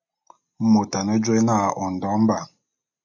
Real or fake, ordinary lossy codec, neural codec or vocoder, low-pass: real; MP3, 64 kbps; none; 7.2 kHz